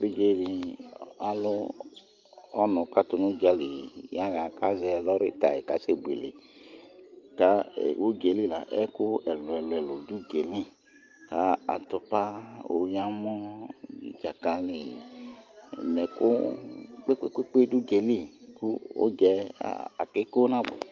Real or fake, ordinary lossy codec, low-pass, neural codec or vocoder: real; Opus, 24 kbps; 7.2 kHz; none